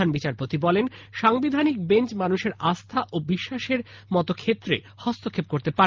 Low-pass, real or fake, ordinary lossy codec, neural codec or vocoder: 7.2 kHz; real; Opus, 24 kbps; none